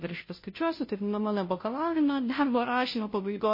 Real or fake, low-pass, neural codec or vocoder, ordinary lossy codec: fake; 5.4 kHz; codec, 16 kHz, 0.5 kbps, FunCodec, trained on Chinese and English, 25 frames a second; MP3, 24 kbps